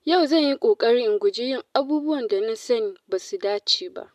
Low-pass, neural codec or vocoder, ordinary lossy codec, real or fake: 14.4 kHz; none; AAC, 96 kbps; real